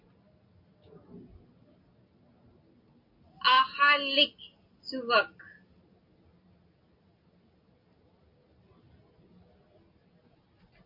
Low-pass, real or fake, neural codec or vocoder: 5.4 kHz; real; none